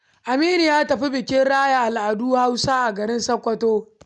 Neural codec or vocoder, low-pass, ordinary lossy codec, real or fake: none; 10.8 kHz; none; real